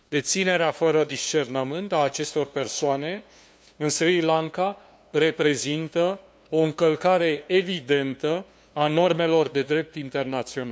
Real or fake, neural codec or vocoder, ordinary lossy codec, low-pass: fake; codec, 16 kHz, 2 kbps, FunCodec, trained on LibriTTS, 25 frames a second; none; none